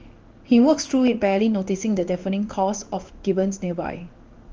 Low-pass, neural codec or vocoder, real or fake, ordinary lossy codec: 7.2 kHz; codec, 16 kHz in and 24 kHz out, 1 kbps, XY-Tokenizer; fake; Opus, 24 kbps